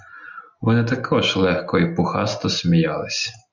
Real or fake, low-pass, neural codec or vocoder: real; 7.2 kHz; none